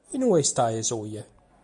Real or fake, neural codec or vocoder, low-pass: real; none; 10.8 kHz